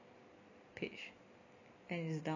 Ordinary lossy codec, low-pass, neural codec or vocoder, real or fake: none; 7.2 kHz; none; real